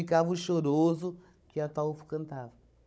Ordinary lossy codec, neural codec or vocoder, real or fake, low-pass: none; codec, 16 kHz, 16 kbps, FunCodec, trained on Chinese and English, 50 frames a second; fake; none